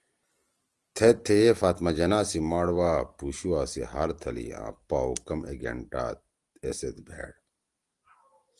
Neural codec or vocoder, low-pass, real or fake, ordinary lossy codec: none; 10.8 kHz; real; Opus, 24 kbps